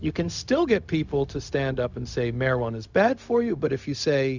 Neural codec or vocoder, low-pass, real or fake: codec, 16 kHz, 0.4 kbps, LongCat-Audio-Codec; 7.2 kHz; fake